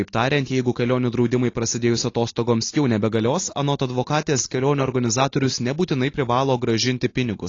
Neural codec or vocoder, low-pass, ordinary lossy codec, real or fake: none; 7.2 kHz; AAC, 32 kbps; real